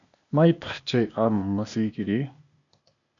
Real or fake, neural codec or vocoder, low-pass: fake; codec, 16 kHz, 0.8 kbps, ZipCodec; 7.2 kHz